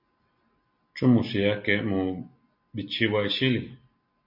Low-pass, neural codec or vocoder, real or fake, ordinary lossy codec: 5.4 kHz; none; real; MP3, 32 kbps